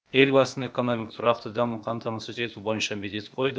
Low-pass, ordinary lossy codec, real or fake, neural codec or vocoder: none; none; fake; codec, 16 kHz, 0.8 kbps, ZipCodec